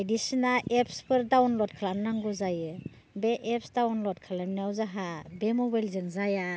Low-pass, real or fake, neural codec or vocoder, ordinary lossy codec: none; real; none; none